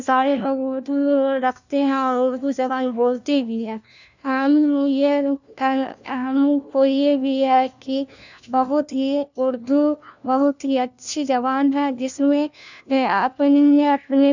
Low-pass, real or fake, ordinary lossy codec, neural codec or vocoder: 7.2 kHz; fake; none; codec, 16 kHz, 1 kbps, FunCodec, trained on LibriTTS, 50 frames a second